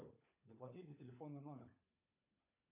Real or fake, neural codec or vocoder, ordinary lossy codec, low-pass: fake; codec, 16 kHz, 16 kbps, FunCodec, trained on LibriTTS, 50 frames a second; AAC, 32 kbps; 3.6 kHz